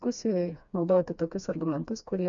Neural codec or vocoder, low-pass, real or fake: codec, 16 kHz, 2 kbps, FreqCodec, smaller model; 7.2 kHz; fake